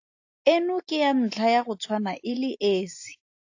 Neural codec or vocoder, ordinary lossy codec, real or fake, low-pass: none; AAC, 48 kbps; real; 7.2 kHz